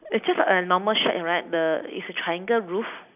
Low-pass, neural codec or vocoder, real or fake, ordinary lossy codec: 3.6 kHz; none; real; none